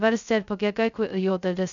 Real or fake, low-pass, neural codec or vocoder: fake; 7.2 kHz; codec, 16 kHz, 0.2 kbps, FocalCodec